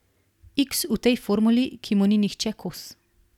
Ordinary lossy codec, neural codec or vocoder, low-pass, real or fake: none; none; 19.8 kHz; real